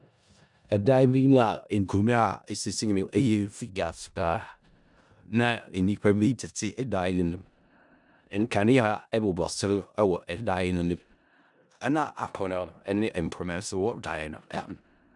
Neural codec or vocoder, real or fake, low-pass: codec, 16 kHz in and 24 kHz out, 0.4 kbps, LongCat-Audio-Codec, four codebook decoder; fake; 10.8 kHz